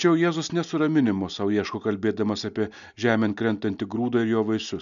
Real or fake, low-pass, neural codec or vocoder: real; 7.2 kHz; none